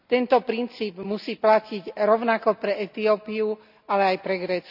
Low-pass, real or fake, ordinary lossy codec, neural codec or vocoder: 5.4 kHz; real; none; none